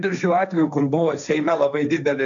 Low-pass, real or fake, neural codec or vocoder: 7.2 kHz; fake; codec, 16 kHz, 1.1 kbps, Voila-Tokenizer